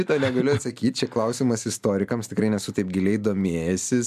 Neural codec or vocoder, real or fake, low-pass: none; real; 14.4 kHz